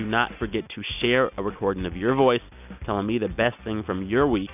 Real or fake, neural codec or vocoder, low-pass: real; none; 3.6 kHz